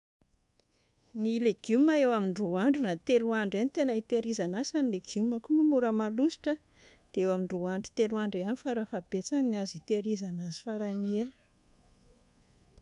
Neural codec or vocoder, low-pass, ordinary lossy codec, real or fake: codec, 24 kHz, 1.2 kbps, DualCodec; 10.8 kHz; MP3, 96 kbps; fake